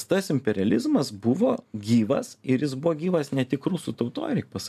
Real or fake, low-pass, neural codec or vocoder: real; 14.4 kHz; none